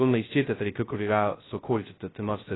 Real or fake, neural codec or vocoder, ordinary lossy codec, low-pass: fake; codec, 16 kHz, 0.2 kbps, FocalCodec; AAC, 16 kbps; 7.2 kHz